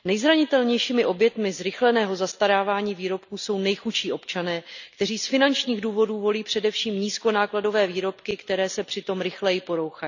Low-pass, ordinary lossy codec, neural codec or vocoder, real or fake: 7.2 kHz; none; none; real